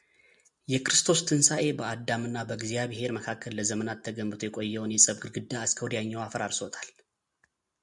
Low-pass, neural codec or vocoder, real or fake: 10.8 kHz; none; real